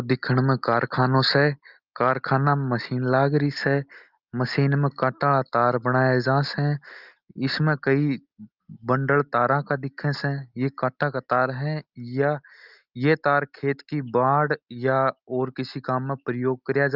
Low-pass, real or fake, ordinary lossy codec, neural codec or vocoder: 5.4 kHz; real; Opus, 32 kbps; none